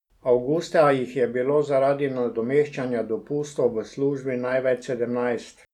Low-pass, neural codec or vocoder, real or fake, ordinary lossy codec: 19.8 kHz; none; real; none